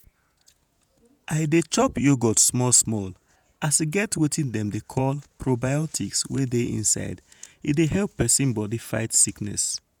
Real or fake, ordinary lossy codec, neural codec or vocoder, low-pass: fake; none; vocoder, 48 kHz, 128 mel bands, Vocos; none